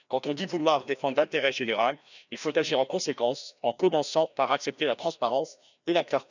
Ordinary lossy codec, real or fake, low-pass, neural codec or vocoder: none; fake; 7.2 kHz; codec, 16 kHz, 1 kbps, FreqCodec, larger model